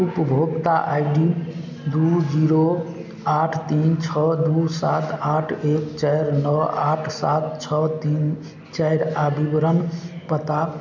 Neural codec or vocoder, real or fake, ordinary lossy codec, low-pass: none; real; none; 7.2 kHz